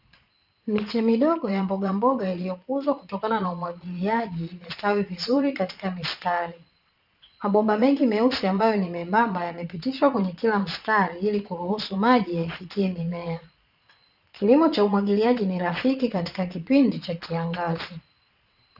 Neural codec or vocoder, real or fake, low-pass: vocoder, 22.05 kHz, 80 mel bands, Vocos; fake; 5.4 kHz